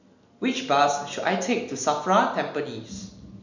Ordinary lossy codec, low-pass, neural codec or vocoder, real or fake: none; 7.2 kHz; none; real